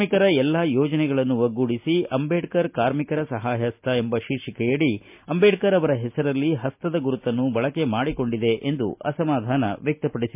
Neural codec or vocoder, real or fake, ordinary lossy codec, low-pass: none; real; MP3, 32 kbps; 3.6 kHz